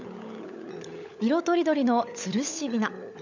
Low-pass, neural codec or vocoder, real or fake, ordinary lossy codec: 7.2 kHz; codec, 16 kHz, 16 kbps, FunCodec, trained on LibriTTS, 50 frames a second; fake; none